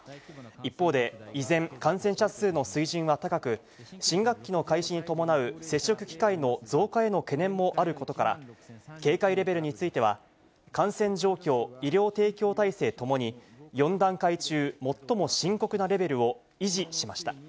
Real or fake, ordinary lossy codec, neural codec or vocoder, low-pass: real; none; none; none